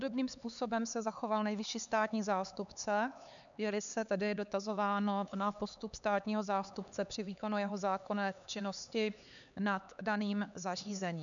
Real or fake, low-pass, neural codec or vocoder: fake; 7.2 kHz; codec, 16 kHz, 4 kbps, X-Codec, HuBERT features, trained on LibriSpeech